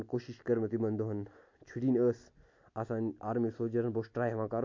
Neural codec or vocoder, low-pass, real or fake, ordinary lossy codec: none; 7.2 kHz; real; MP3, 48 kbps